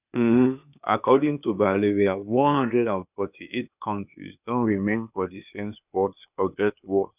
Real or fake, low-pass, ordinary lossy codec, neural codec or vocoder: fake; 3.6 kHz; none; codec, 16 kHz, 0.8 kbps, ZipCodec